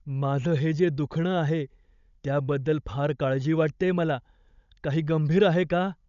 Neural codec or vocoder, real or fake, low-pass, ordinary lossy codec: codec, 16 kHz, 16 kbps, FunCodec, trained on Chinese and English, 50 frames a second; fake; 7.2 kHz; none